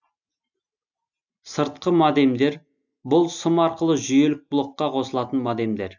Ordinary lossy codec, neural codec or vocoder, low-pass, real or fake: none; none; 7.2 kHz; real